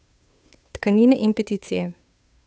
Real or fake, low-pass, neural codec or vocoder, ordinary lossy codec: fake; none; codec, 16 kHz, 2 kbps, FunCodec, trained on Chinese and English, 25 frames a second; none